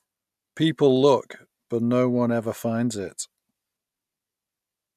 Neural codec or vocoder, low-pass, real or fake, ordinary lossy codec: none; 14.4 kHz; real; none